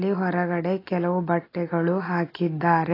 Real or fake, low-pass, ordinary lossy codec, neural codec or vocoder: real; 5.4 kHz; AAC, 24 kbps; none